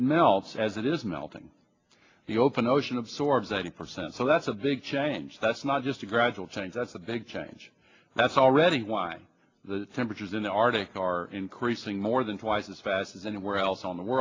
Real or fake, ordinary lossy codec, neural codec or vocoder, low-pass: real; AAC, 32 kbps; none; 7.2 kHz